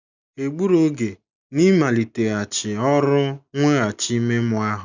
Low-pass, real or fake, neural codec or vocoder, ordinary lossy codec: 7.2 kHz; real; none; none